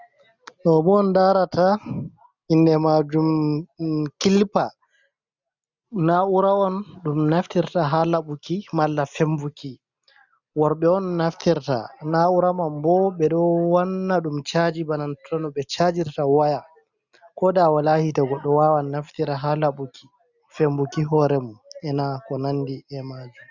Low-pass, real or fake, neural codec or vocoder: 7.2 kHz; real; none